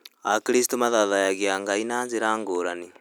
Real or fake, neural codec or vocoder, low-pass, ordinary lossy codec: real; none; none; none